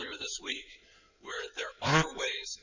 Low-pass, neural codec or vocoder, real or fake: 7.2 kHz; codec, 16 kHz in and 24 kHz out, 2.2 kbps, FireRedTTS-2 codec; fake